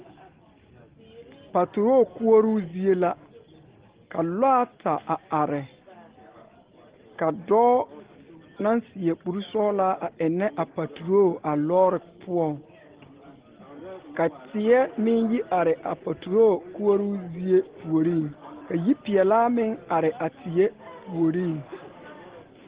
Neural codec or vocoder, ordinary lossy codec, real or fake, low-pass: none; Opus, 16 kbps; real; 3.6 kHz